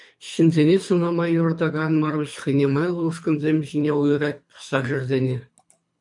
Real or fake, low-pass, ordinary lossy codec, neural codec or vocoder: fake; 10.8 kHz; MP3, 64 kbps; codec, 24 kHz, 3 kbps, HILCodec